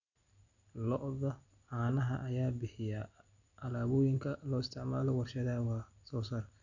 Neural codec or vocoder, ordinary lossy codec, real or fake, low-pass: none; none; real; 7.2 kHz